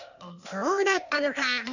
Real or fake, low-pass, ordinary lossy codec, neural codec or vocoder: fake; 7.2 kHz; none; codec, 16 kHz, 0.8 kbps, ZipCodec